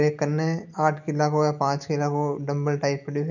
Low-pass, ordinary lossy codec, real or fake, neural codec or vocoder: 7.2 kHz; none; real; none